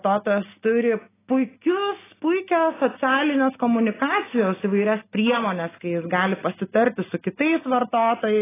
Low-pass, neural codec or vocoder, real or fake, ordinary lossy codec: 3.6 kHz; none; real; AAC, 16 kbps